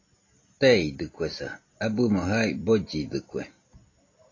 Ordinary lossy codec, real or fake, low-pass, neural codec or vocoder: AAC, 32 kbps; real; 7.2 kHz; none